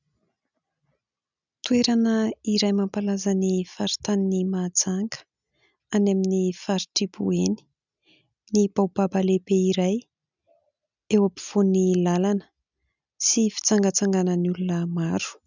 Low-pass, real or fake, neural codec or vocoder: 7.2 kHz; real; none